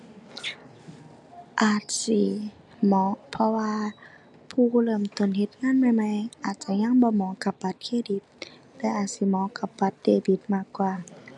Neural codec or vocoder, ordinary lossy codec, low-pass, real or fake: none; none; 10.8 kHz; real